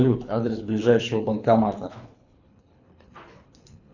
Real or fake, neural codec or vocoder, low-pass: fake; codec, 24 kHz, 3 kbps, HILCodec; 7.2 kHz